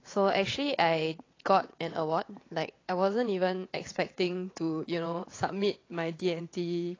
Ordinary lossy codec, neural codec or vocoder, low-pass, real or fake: AAC, 32 kbps; vocoder, 22.05 kHz, 80 mel bands, WaveNeXt; 7.2 kHz; fake